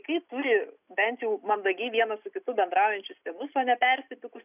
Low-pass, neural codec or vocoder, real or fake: 3.6 kHz; none; real